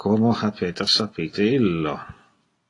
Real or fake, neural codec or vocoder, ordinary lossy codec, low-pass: real; none; AAC, 32 kbps; 10.8 kHz